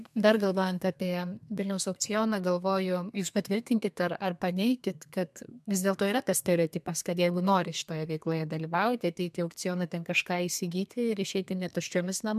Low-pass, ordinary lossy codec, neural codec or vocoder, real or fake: 14.4 kHz; MP3, 96 kbps; codec, 32 kHz, 1.9 kbps, SNAC; fake